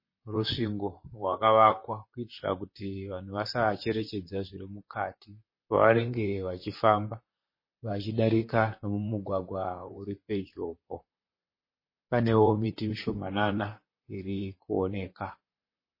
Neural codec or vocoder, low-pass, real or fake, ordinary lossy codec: vocoder, 44.1 kHz, 128 mel bands, Pupu-Vocoder; 5.4 kHz; fake; MP3, 24 kbps